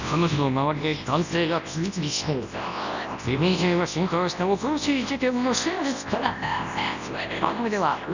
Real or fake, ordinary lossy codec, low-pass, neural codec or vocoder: fake; none; 7.2 kHz; codec, 24 kHz, 0.9 kbps, WavTokenizer, large speech release